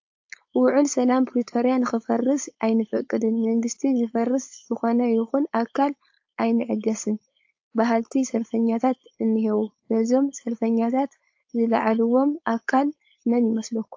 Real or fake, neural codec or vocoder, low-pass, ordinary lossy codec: fake; codec, 16 kHz, 4.8 kbps, FACodec; 7.2 kHz; AAC, 48 kbps